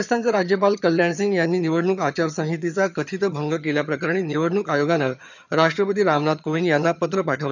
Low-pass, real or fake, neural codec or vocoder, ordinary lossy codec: 7.2 kHz; fake; vocoder, 22.05 kHz, 80 mel bands, HiFi-GAN; none